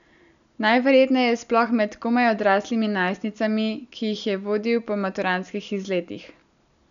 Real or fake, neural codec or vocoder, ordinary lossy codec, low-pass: real; none; none; 7.2 kHz